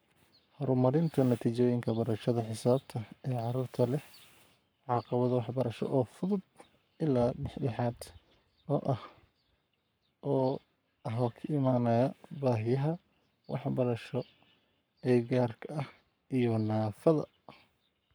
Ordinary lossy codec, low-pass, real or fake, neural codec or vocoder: none; none; fake; codec, 44.1 kHz, 7.8 kbps, Pupu-Codec